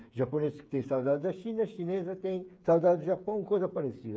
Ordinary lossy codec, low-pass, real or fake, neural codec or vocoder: none; none; fake; codec, 16 kHz, 8 kbps, FreqCodec, smaller model